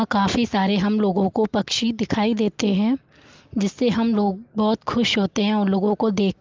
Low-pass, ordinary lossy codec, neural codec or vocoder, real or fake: 7.2 kHz; Opus, 32 kbps; none; real